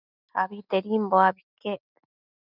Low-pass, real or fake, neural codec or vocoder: 5.4 kHz; real; none